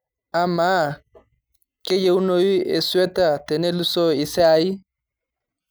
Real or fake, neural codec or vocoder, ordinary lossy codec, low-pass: real; none; none; none